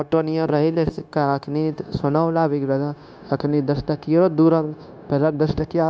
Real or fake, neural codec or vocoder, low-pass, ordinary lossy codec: fake; codec, 16 kHz, 0.9 kbps, LongCat-Audio-Codec; none; none